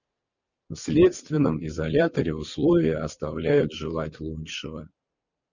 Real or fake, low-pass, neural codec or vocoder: real; 7.2 kHz; none